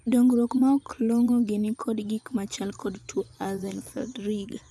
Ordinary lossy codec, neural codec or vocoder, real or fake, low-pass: none; vocoder, 24 kHz, 100 mel bands, Vocos; fake; none